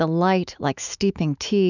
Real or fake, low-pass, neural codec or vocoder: fake; 7.2 kHz; autoencoder, 48 kHz, 128 numbers a frame, DAC-VAE, trained on Japanese speech